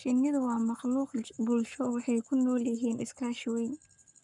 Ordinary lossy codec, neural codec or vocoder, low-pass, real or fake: none; codec, 44.1 kHz, 7.8 kbps, Pupu-Codec; 10.8 kHz; fake